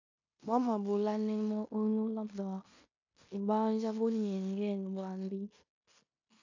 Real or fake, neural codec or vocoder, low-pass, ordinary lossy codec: fake; codec, 16 kHz in and 24 kHz out, 0.9 kbps, LongCat-Audio-Codec, fine tuned four codebook decoder; 7.2 kHz; AAC, 48 kbps